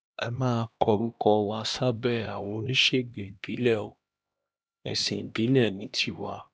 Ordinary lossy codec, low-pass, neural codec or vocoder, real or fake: none; none; codec, 16 kHz, 1 kbps, X-Codec, HuBERT features, trained on LibriSpeech; fake